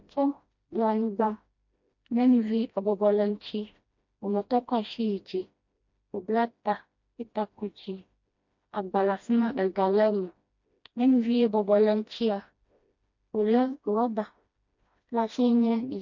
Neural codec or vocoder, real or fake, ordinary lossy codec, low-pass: codec, 16 kHz, 1 kbps, FreqCodec, smaller model; fake; MP3, 48 kbps; 7.2 kHz